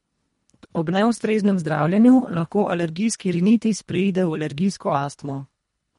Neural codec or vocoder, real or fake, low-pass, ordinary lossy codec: codec, 24 kHz, 1.5 kbps, HILCodec; fake; 10.8 kHz; MP3, 48 kbps